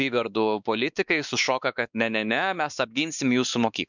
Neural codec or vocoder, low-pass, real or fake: codec, 16 kHz, 2 kbps, X-Codec, WavLM features, trained on Multilingual LibriSpeech; 7.2 kHz; fake